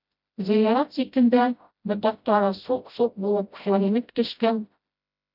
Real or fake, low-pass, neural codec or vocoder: fake; 5.4 kHz; codec, 16 kHz, 0.5 kbps, FreqCodec, smaller model